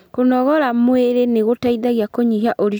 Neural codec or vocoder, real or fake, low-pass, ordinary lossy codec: none; real; none; none